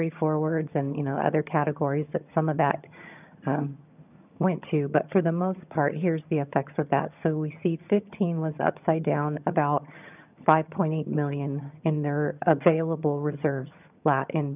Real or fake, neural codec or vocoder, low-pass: fake; vocoder, 22.05 kHz, 80 mel bands, HiFi-GAN; 3.6 kHz